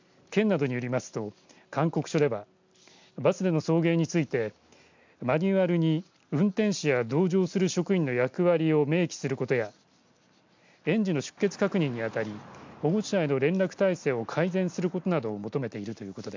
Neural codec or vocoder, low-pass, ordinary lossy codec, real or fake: none; 7.2 kHz; MP3, 64 kbps; real